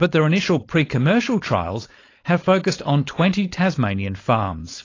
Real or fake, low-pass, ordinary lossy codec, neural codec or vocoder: fake; 7.2 kHz; AAC, 32 kbps; codec, 16 kHz, 4.8 kbps, FACodec